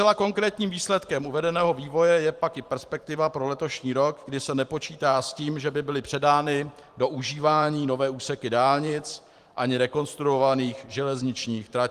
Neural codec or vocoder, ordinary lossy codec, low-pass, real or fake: vocoder, 44.1 kHz, 128 mel bands every 512 samples, BigVGAN v2; Opus, 24 kbps; 14.4 kHz; fake